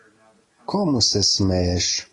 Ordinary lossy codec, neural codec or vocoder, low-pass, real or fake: AAC, 32 kbps; none; 10.8 kHz; real